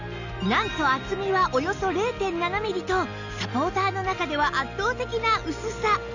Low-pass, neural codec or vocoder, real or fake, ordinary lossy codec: 7.2 kHz; none; real; none